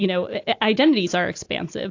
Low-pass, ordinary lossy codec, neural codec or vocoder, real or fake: 7.2 kHz; AAC, 48 kbps; none; real